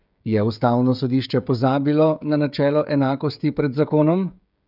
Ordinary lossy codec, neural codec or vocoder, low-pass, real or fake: none; codec, 16 kHz, 16 kbps, FreqCodec, smaller model; 5.4 kHz; fake